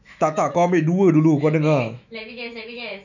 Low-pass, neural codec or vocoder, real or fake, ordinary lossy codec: 7.2 kHz; autoencoder, 48 kHz, 128 numbers a frame, DAC-VAE, trained on Japanese speech; fake; none